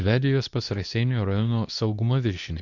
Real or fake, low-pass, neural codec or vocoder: fake; 7.2 kHz; codec, 24 kHz, 0.9 kbps, WavTokenizer, medium speech release version 2